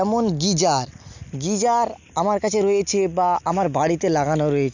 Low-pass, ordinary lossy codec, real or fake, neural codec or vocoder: 7.2 kHz; none; real; none